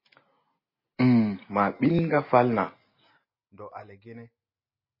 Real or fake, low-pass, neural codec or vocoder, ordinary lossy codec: real; 5.4 kHz; none; MP3, 24 kbps